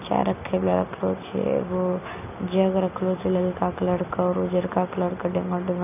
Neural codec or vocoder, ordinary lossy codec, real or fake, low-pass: none; none; real; 3.6 kHz